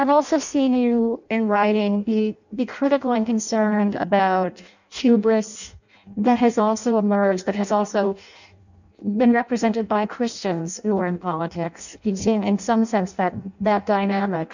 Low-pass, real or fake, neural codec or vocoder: 7.2 kHz; fake; codec, 16 kHz in and 24 kHz out, 0.6 kbps, FireRedTTS-2 codec